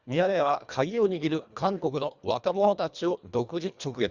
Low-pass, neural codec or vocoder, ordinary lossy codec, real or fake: 7.2 kHz; codec, 24 kHz, 1.5 kbps, HILCodec; Opus, 64 kbps; fake